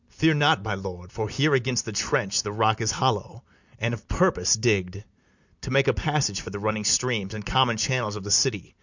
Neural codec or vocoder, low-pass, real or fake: none; 7.2 kHz; real